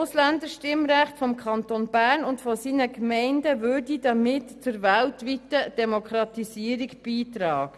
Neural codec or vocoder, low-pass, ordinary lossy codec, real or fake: none; none; none; real